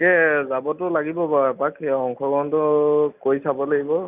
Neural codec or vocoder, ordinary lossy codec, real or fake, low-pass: none; none; real; 3.6 kHz